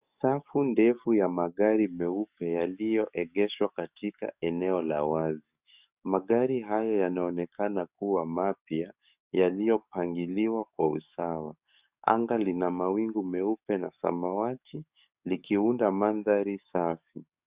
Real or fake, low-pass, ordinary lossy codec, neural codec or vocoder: fake; 3.6 kHz; Opus, 64 kbps; codec, 44.1 kHz, 7.8 kbps, DAC